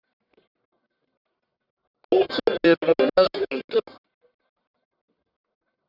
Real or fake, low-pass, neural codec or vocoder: fake; 5.4 kHz; codec, 44.1 kHz, 1.7 kbps, Pupu-Codec